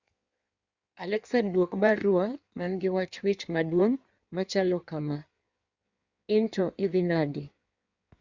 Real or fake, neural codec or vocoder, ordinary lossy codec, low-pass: fake; codec, 16 kHz in and 24 kHz out, 1.1 kbps, FireRedTTS-2 codec; Opus, 64 kbps; 7.2 kHz